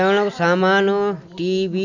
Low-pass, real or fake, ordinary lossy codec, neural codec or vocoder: 7.2 kHz; real; none; none